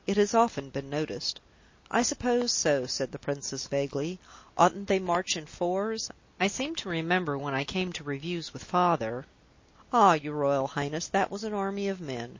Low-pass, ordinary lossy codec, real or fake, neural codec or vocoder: 7.2 kHz; MP3, 32 kbps; real; none